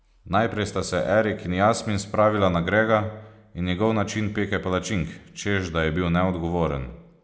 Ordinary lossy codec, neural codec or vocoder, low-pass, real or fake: none; none; none; real